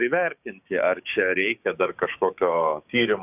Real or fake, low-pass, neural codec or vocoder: fake; 3.6 kHz; codec, 16 kHz, 6 kbps, DAC